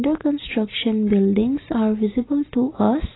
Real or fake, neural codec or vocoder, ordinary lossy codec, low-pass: real; none; AAC, 16 kbps; 7.2 kHz